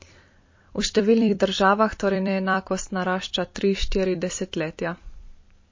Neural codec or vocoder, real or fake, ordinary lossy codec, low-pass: vocoder, 44.1 kHz, 128 mel bands every 256 samples, BigVGAN v2; fake; MP3, 32 kbps; 7.2 kHz